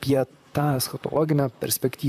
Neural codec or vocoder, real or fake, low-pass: vocoder, 44.1 kHz, 128 mel bands, Pupu-Vocoder; fake; 14.4 kHz